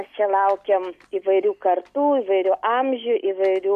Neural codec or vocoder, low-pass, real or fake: none; 14.4 kHz; real